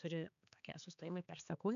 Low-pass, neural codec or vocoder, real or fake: 7.2 kHz; codec, 16 kHz, 4 kbps, X-Codec, HuBERT features, trained on balanced general audio; fake